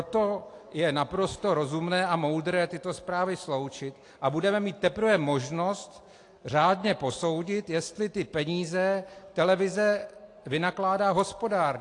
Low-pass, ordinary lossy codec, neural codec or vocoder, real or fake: 10.8 kHz; AAC, 48 kbps; none; real